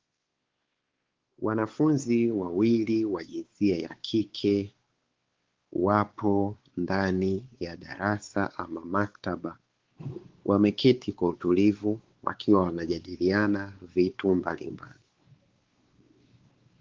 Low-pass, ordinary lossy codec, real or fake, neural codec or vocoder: 7.2 kHz; Opus, 16 kbps; fake; codec, 16 kHz, 4 kbps, X-Codec, WavLM features, trained on Multilingual LibriSpeech